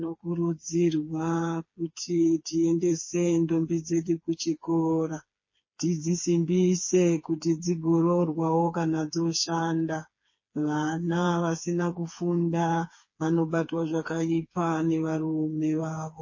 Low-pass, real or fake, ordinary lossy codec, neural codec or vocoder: 7.2 kHz; fake; MP3, 32 kbps; codec, 16 kHz, 4 kbps, FreqCodec, smaller model